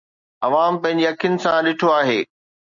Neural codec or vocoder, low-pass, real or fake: none; 7.2 kHz; real